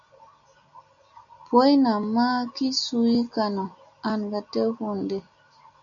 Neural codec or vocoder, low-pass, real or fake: none; 7.2 kHz; real